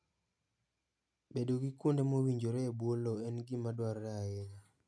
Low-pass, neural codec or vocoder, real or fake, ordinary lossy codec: none; none; real; none